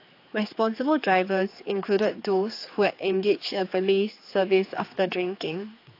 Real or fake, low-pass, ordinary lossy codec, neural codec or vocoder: fake; 5.4 kHz; AAC, 32 kbps; codec, 16 kHz, 4 kbps, X-Codec, HuBERT features, trained on general audio